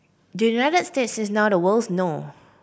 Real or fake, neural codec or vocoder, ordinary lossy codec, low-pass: real; none; none; none